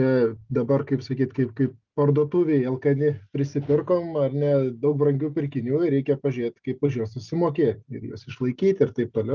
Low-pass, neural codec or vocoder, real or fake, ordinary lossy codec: 7.2 kHz; none; real; Opus, 32 kbps